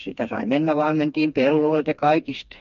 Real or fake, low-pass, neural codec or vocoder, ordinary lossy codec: fake; 7.2 kHz; codec, 16 kHz, 2 kbps, FreqCodec, smaller model; MP3, 48 kbps